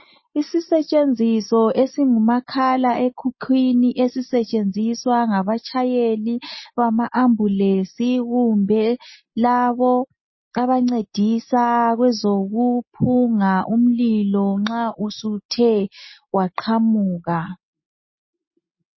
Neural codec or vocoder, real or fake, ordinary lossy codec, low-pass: none; real; MP3, 24 kbps; 7.2 kHz